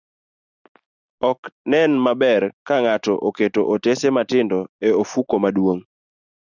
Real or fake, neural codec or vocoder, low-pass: real; none; 7.2 kHz